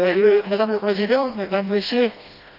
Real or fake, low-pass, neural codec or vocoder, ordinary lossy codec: fake; 5.4 kHz; codec, 16 kHz, 1 kbps, FreqCodec, smaller model; none